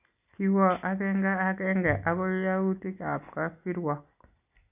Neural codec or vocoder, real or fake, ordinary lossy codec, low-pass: none; real; none; 3.6 kHz